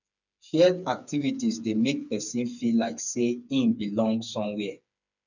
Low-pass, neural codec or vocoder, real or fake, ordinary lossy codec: 7.2 kHz; codec, 16 kHz, 4 kbps, FreqCodec, smaller model; fake; none